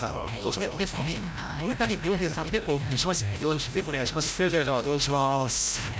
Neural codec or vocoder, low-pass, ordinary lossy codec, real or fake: codec, 16 kHz, 0.5 kbps, FreqCodec, larger model; none; none; fake